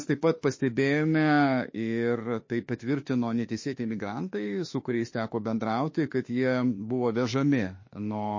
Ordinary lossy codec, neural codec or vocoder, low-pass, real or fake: MP3, 32 kbps; autoencoder, 48 kHz, 32 numbers a frame, DAC-VAE, trained on Japanese speech; 7.2 kHz; fake